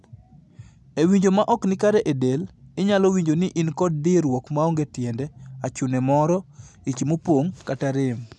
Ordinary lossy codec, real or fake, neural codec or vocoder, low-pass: none; real; none; none